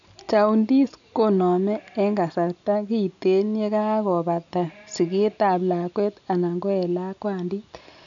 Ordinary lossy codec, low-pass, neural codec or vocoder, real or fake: none; 7.2 kHz; none; real